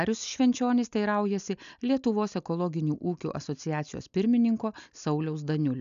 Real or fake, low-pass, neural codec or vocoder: real; 7.2 kHz; none